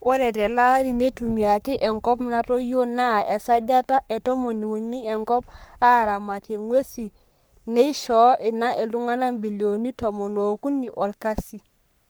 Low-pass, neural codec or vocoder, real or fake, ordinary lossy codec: none; codec, 44.1 kHz, 3.4 kbps, Pupu-Codec; fake; none